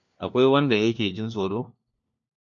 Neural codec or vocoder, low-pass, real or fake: codec, 16 kHz, 1 kbps, FunCodec, trained on LibriTTS, 50 frames a second; 7.2 kHz; fake